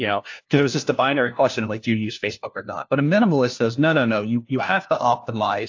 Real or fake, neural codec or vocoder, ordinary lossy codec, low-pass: fake; codec, 16 kHz, 1 kbps, FunCodec, trained on LibriTTS, 50 frames a second; AAC, 48 kbps; 7.2 kHz